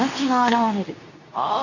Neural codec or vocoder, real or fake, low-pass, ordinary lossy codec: codec, 24 kHz, 0.9 kbps, WavTokenizer, medium speech release version 2; fake; 7.2 kHz; none